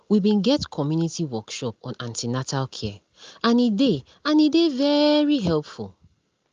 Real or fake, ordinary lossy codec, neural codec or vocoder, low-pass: real; Opus, 32 kbps; none; 7.2 kHz